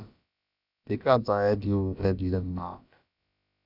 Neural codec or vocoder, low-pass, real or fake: codec, 16 kHz, about 1 kbps, DyCAST, with the encoder's durations; 5.4 kHz; fake